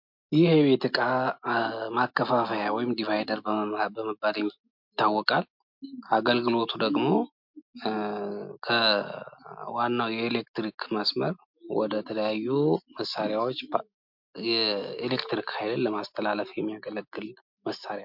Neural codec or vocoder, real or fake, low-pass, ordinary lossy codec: none; real; 5.4 kHz; MP3, 48 kbps